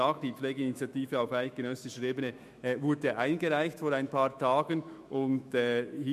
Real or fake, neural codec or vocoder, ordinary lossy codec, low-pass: fake; autoencoder, 48 kHz, 128 numbers a frame, DAC-VAE, trained on Japanese speech; MP3, 64 kbps; 14.4 kHz